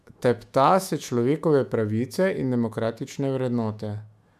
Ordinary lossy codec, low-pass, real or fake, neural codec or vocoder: MP3, 96 kbps; 14.4 kHz; fake; autoencoder, 48 kHz, 128 numbers a frame, DAC-VAE, trained on Japanese speech